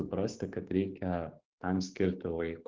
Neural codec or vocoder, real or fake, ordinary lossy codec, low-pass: codec, 16 kHz, 6 kbps, DAC; fake; Opus, 16 kbps; 7.2 kHz